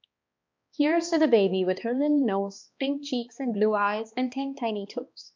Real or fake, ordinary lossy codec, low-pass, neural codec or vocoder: fake; MP3, 64 kbps; 7.2 kHz; codec, 16 kHz, 2 kbps, X-Codec, HuBERT features, trained on balanced general audio